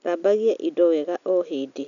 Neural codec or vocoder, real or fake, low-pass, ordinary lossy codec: none; real; 7.2 kHz; none